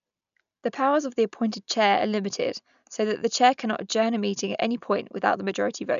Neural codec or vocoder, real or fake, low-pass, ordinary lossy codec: none; real; 7.2 kHz; none